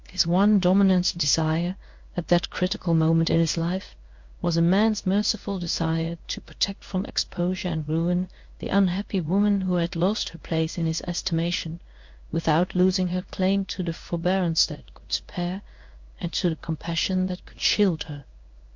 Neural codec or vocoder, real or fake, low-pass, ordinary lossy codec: codec, 16 kHz in and 24 kHz out, 1 kbps, XY-Tokenizer; fake; 7.2 kHz; MP3, 48 kbps